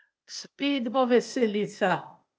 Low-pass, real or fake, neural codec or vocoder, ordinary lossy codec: none; fake; codec, 16 kHz, 0.8 kbps, ZipCodec; none